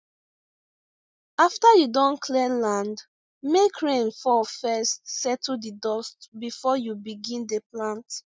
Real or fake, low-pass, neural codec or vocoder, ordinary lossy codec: real; none; none; none